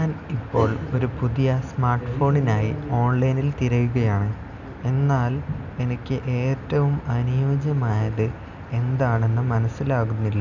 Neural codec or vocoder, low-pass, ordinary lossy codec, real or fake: none; 7.2 kHz; none; real